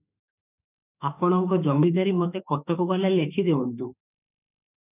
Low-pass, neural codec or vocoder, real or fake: 3.6 kHz; autoencoder, 48 kHz, 32 numbers a frame, DAC-VAE, trained on Japanese speech; fake